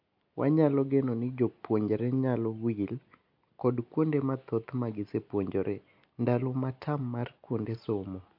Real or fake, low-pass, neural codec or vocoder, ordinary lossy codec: real; 5.4 kHz; none; MP3, 48 kbps